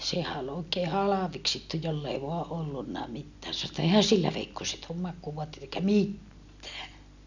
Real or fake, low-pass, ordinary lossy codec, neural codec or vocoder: real; 7.2 kHz; none; none